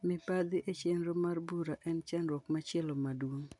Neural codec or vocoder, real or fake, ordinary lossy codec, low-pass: none; real; MP3, 96 kbps; 10.8 kHz